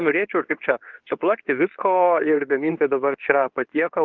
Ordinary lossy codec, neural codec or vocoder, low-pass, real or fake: Opus, 24 kbps; codec, 24 kHz, 0.9 kbps, WavTokenizer, medium speech release version 2; 7.2 kHz; fake